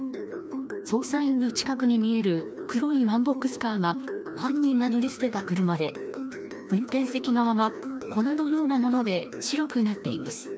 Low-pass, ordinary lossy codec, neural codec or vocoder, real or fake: none; none; codec, 16 kHz, 1 kbps, FreqCodec, larger model; fake